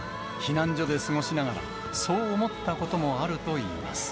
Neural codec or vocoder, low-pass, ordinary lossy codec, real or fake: none; none; none; real